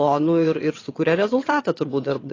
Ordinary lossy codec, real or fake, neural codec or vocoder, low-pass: AAC, 32 kbps; fake; vocoder, 22.05 kHz, 80 mel bands, WaveNeXt; 7.2 kHz